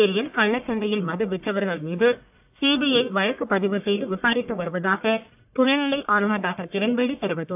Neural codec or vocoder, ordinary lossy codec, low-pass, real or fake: codec, 44.1 kHz, 1.7 kbps, Pupu-Codec; AAC, 32 kbps; 3.6 kHz; fake